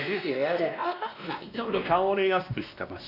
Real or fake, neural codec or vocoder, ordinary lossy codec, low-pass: fake; codec, 16 kHz, 1 kbps, X-Codec, WavLM features, trained on Multilingual LibriSpeech; none; 5.4 kHz